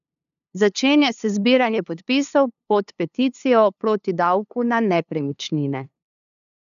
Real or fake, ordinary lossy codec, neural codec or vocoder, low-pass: fake; none; codec, 16 kHz, 2 kbps, FunCodec, trained on LibriTTS, 25 frames a second; 7.2 kHz